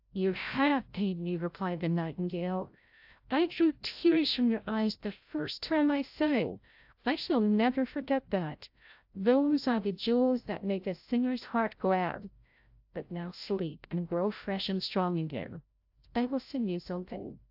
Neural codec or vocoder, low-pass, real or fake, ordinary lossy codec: codec, 16 kHz, 0.5 kbps, FreqCodec, larger model; 5.4 kHz; fake; AAC, 48 kbps